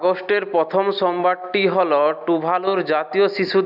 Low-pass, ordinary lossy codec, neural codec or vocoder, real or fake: 5.4 kHz; none; none; real